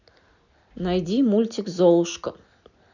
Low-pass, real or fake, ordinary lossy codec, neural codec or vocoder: 7.2 kHz; real; none; none